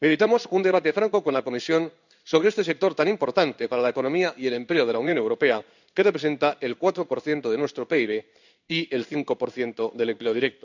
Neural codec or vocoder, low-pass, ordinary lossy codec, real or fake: codec, 16 kHz in and 24 kHz out, 1 kbps, XY-Tokenizer; 7.2 kHz; none; fake